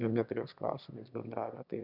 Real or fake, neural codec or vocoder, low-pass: fake; autoencoder, 22.05 kHz, a latent of 192 numbers a frame, VITS, trained on one speaker; 5.4 kHz